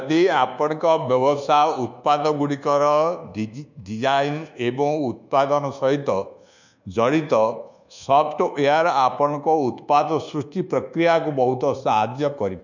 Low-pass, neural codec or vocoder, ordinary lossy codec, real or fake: 7.2 kHz; codec, 24 kHz, 1.2 kbps, DualCodec; none; fake